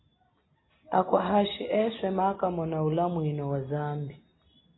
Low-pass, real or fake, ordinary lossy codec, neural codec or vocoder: 7.2 kHz; real; AAC, 16 kbps; none